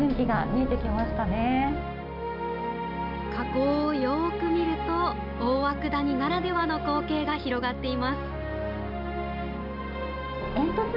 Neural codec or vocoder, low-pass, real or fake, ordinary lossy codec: none; 5.4 kHz; real; none